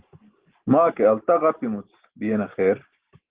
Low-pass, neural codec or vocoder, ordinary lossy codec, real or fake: 3.6 kHz; none; Opus, 16 kbps; real